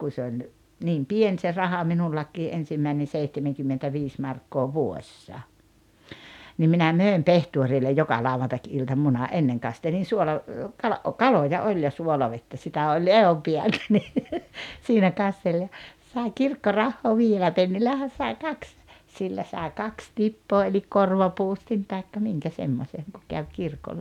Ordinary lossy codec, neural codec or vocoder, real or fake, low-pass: none; none; real; 19.8 kHz